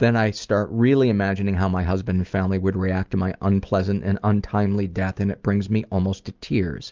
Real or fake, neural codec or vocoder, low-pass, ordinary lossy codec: real; none; 7.2 kHz; Opus, 24 kbps